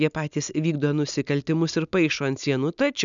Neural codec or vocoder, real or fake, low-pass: none; real; 7.2 kHz